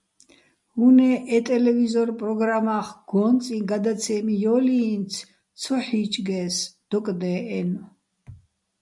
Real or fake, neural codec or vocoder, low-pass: real; none; 10.8 kHz